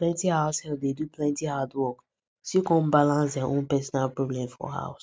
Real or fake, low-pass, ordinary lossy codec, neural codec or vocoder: real; none; none; none